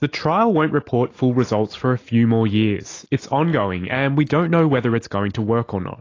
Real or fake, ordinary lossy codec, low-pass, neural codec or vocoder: real; AAC, 32 kbps; 7.2 kHz; none